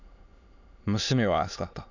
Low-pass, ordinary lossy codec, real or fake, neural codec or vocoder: 7.2 kHz; none; fake; autoencoder, 22.05 kHz, a latent of 192 numbers a frame, VITS, trained on many speakers